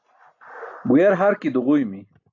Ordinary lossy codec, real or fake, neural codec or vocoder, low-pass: AAC, 48 kbps; real; none; 7.2 kHz